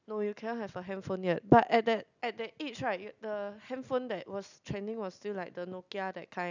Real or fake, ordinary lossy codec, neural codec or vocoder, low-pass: real; none; none; 7.2 kHz